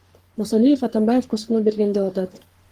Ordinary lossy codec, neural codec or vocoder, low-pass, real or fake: Opus, 24 kbps; codec, 44.1 kHz, 3.4 kbps, Pupu-Codec; 14.4 kHz; fake